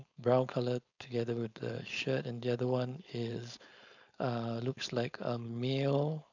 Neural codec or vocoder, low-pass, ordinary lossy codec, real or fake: codec, 16 kHz, 4.8 kbps, FACodec; 7.2 kHz; none; fake